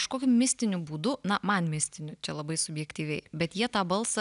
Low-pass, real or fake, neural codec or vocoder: 10.8 kHz; real; none